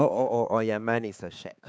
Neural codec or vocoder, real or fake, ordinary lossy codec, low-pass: codec, 16 kHz, 4 kbps, X-Codec, HuBERT features, trained on balanced general audio; fake; none; none